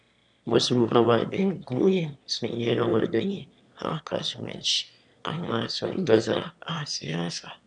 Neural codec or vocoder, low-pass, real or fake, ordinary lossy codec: autoencoder, 22.05 kHz, a latent of 192 numbers a frame, VITS, trained on one speaker; 9.9 kHz; fake; none